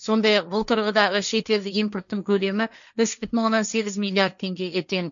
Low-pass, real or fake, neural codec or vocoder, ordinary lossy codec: 7.2 kHz; fake; codec, 16 kHz, 1.1 kbps, Voila-Tokenizer; none